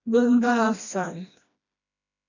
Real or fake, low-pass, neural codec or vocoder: fake; 7.2 kHz; codec, 16 kHz, 1 kbps, FreqCodec, smaller model